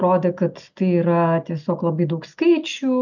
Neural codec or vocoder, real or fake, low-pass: none; real; 7.2 kHz